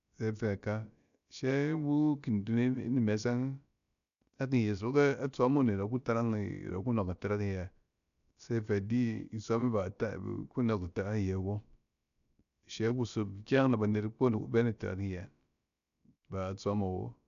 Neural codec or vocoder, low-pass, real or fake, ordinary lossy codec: codec, 16 kHz, about 1 kbps, DyCAST, with the encoder's durations; 7.2 kHz; fake; none